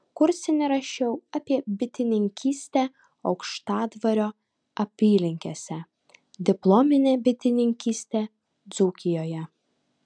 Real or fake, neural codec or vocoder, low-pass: real; none; 9.9 kHz